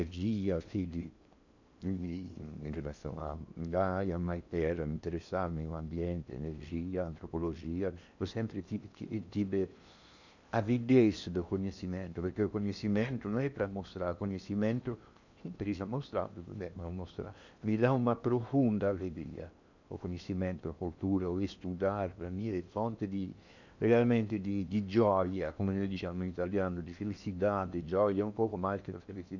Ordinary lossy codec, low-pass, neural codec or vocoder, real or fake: none; 7.2 kHz; codec, 16 kHz in and 24 kHz out, 0.8 kbps, FocalCodec, streaming, 65536 codes; fake